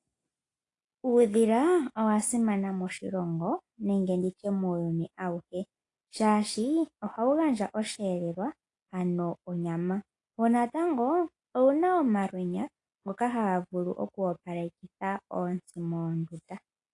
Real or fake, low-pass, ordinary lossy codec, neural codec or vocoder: real; 10.8 kHz; AAC, 48 kbps; none